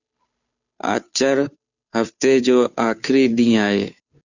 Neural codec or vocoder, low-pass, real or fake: codec, 16 kHz, 2 kbps, FunCodec, trained on Chinese and English, 25 frames a second; 7.2 kHz; fake